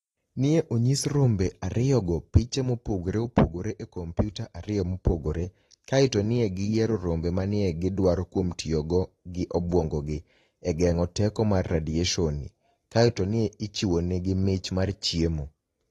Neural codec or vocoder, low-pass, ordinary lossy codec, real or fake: none; 19.8 kHz; AAC, 32 kbps; real